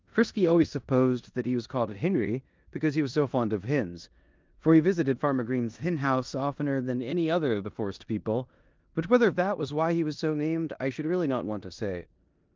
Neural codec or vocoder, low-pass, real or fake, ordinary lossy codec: codec, 16 kHz in and 24 kHz out, 0.9 kbps, LongCat-Audio-Codec, four codebook decoder; 7.2 kHz; fake; Opus, 24 kbps